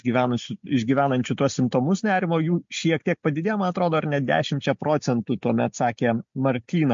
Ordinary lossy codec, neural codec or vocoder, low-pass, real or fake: MP3, 48 kbps; none; 7.2 kHz; real